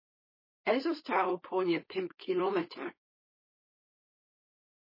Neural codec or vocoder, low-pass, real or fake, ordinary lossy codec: codec, 16 kHz, 4.8 kbps, FACodec; 5.4 kHz; fake; MP3, 24 kbps